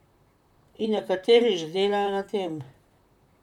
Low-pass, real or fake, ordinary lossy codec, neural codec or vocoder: 19.8 kHz; fake; none; vocoder, 44.1 kHz, 128 mel bands, Pupu-Vocoder